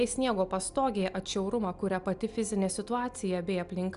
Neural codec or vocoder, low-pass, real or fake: none; 10.8 kHz; real